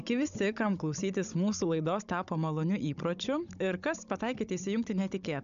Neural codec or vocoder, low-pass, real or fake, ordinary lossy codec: codec, 16 kHz, 16 kbps, FunCodec, trained on Chinese and English, 50 frames a second; 7.2 kHz; fake; AAC, 96 kbps